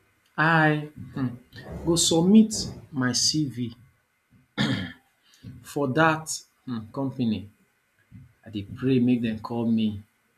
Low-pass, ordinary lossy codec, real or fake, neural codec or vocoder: 14.4 kHz; none; real; none